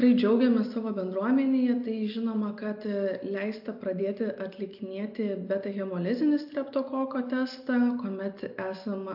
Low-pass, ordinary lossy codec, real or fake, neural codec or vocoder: 5.4 kHz; AAC, 48 kbps; real; none